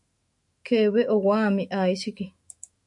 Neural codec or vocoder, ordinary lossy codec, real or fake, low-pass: autoencoder, 48 kHz, 128 numbers a frame, DAC-VAE, trained on Japanese speech; MP3, 48 kbps; fake; 10.8 kHz